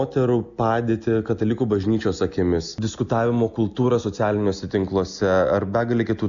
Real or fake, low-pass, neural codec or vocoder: real; 7.2 kHz; none